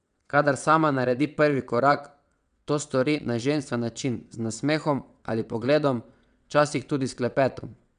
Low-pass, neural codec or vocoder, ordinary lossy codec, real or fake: 9.9 kHz; vocoder, 22.05 kHz, 80 mel bands, WaveNeXt; none; fake